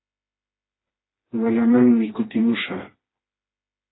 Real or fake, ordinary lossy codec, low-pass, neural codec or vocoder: fake; AAC, 16 kbps; 7.2 kHz; codec, 16 kHz, 2 kbps, FreqCodec, smaller model